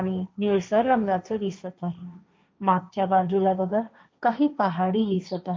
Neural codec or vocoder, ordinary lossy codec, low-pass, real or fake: codec, 16 kHz, 1.1 kbps, Voila-Tokenizer; none; none; fake